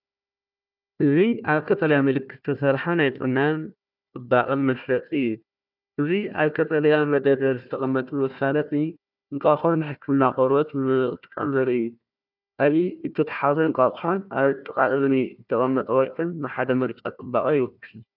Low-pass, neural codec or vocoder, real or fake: 5.4 kHz; codec, 16 kHz, 1 kbps, FunCodec, trained on Chinese and English, 50 frames a second; fake